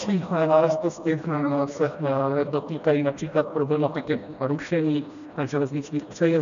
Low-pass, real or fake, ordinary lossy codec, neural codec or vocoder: 7.2 kHz; fake; MP3, 96 kbps; codec, 16 kHz, 1 kbps, FreqCodec, smaller model